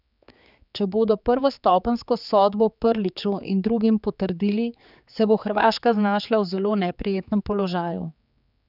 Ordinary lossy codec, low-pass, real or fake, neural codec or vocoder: none; 5.4 kHz; fake; codec, 16 kHz, 4 kbps, X-Codec, HuBERT features, trained on general audio